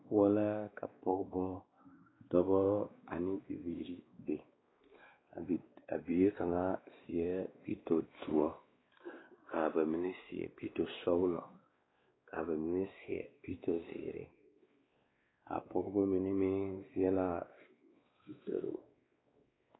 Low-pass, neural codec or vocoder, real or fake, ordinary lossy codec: 7.2 kHz; codec, 16 kHz, 2 kbps, X-Codec, WavLM features, trained on Multilingual LibriSpeech; fake; AAC, 16 kbps